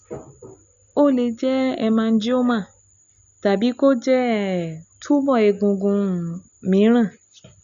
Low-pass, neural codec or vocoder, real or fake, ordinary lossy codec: 7.2 kHz; none; real; none